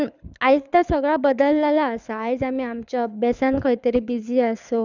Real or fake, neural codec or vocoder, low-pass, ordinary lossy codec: fake; codec, 24 kHz, 6 kbps, HILCodec; 7.2 kHz; none